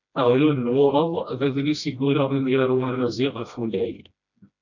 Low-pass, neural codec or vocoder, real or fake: 7.2 kHz; codec, 16 kHz, 1 kbps, FreqCodec, smaller model; fake